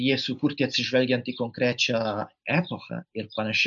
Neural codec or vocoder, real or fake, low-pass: none; real; 7.2 kHz